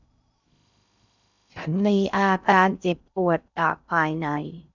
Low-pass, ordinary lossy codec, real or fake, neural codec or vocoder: 7.2 kHz; none; fake; codec, 16 kHz in and 24 kHz out, 0.6 kbps, FocalCodec, streaming, 2048 codes